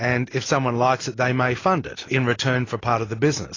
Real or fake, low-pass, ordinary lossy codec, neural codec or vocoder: real; 7.2 kHz; AAC, 32 kbps; none